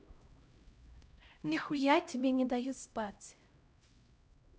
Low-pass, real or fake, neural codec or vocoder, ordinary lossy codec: none; fake; codec, 16 kHz, 0.5 kbps, X-Codec, HuBERT features, trained on LibriSpeech; none